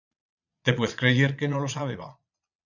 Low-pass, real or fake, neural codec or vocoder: 7.2 kHz; fake; vocoder, 22.05 kHz, 80 mel bands, Vocos